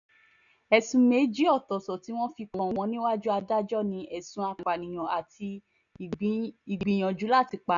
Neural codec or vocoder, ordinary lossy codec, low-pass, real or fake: none; none; 7.2 kHz; real